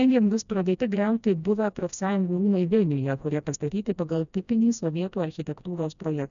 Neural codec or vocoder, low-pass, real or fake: codec, 16 kHz, 1 kbps, FreqCodec, smaller model; 7.2 kHz; fake